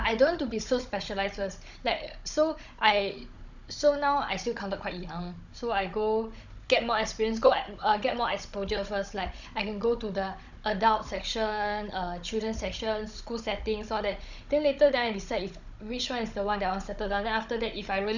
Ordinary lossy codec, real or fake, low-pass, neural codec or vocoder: none; fake; 7.2 kHz; codec, 16 kHz, 16 kbps, FunCodec, trained on Chinese and English, 50 frames a second